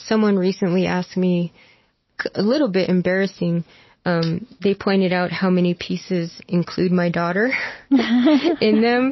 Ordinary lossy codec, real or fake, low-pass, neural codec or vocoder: MP3, 24 kbps; real; 7.2 kHz; none